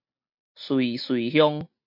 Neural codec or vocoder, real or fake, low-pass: none; real; 5.4 kHz